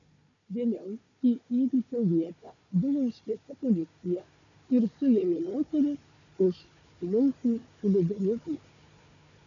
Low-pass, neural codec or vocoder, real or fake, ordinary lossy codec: 7.2 kHz; codec, 16 kHz, 4 kbps, FunCodec, trained on Chinese and English, 50 frames a second; fake; MP3, 96 kbps